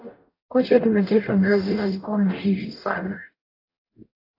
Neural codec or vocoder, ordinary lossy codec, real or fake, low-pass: codec, 44.1 kHz, 0.9 kbps, DAC; AAC, 24 kbps; fake; 5.4 kHz